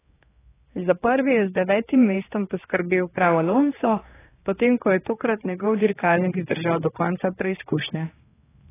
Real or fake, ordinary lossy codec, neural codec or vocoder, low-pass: fake; AAC, 16 kbps; codec, 16 kHz, 2 kbps, X-Codec, HuBERT features, trained on general audio; 3.6 kHz